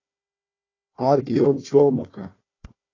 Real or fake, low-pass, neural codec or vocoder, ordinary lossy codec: fake; 7.2 kHz; codec, 16 kHz, 1 kbps, FunCodec, trained on Chinese and English, 50 frames a second; AAC, 32 kbps